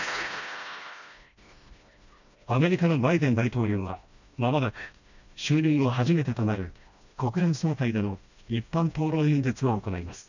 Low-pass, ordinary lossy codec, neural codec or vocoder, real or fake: 7.2 kHz; none; codec, 16 kHz, 1 kbps, FreqCodec, smaller model; fake